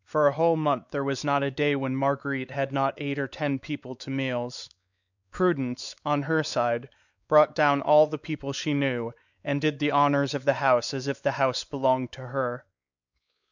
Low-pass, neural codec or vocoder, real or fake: 7.2 kHz; codec, 16 kHz, 4 kbps, X-Codec, WavLM features, trained on Multilingual LibriSpeech; fake